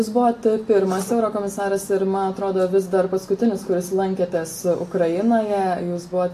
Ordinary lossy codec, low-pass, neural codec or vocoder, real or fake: AAC, 48 kbps; 14.4 kHz; none; real